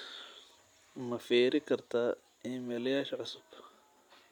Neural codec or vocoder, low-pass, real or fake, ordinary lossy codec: none; 19.8 kHz; real; none